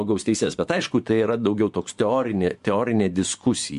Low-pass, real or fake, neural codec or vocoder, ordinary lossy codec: 10.8 kHz; fake; vocoder, 24 kHz, 100 mel bands, Vocos; MP3, 64 kbps